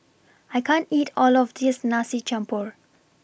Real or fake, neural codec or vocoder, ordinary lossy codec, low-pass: real; none; none; none